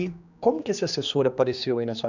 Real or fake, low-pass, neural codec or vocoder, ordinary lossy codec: fake; 7.2 kHz; codec, 16 kHz, 2 kbps, X-Codec, HuBERT features, trained on general audio; none